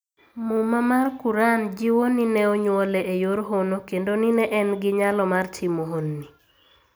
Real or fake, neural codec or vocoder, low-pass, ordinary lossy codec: real; none; none; none